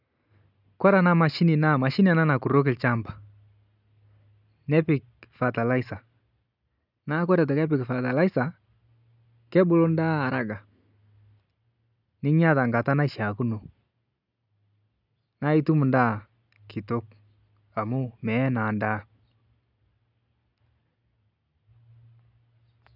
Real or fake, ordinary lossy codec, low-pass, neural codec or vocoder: real; none; 5.4 kHz; none